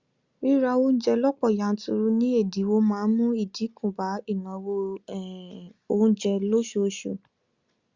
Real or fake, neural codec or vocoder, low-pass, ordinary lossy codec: real; none; 7.2 kHz; Opus, 64 kbps